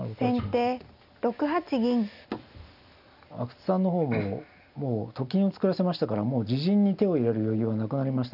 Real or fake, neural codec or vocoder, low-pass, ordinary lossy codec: real; none; 5.4 kHz; none